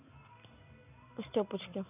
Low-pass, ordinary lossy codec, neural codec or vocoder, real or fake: 3.6 kHz; none; none; real